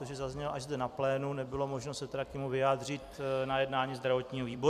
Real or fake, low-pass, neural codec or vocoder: fake; 14.4 kHz; vocoder, 44.1 kHz, 128 mel bands every 256 samples, BigVGAN v2